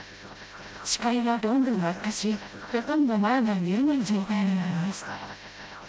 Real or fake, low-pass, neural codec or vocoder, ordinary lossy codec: fake; none; codec, 16 kHz, 0.5 kbps, FreqCodec, smaller model; none